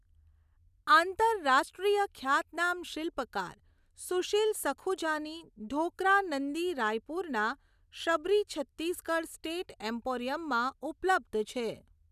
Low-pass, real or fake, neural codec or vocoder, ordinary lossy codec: 14.4 kHz; real; none; none